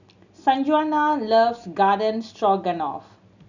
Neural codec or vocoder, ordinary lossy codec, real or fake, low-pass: none; none; real; 7.2 kHz